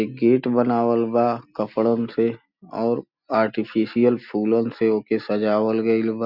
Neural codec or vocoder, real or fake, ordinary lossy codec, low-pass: none; real; Opus, 64 kbps; 5.4 kHz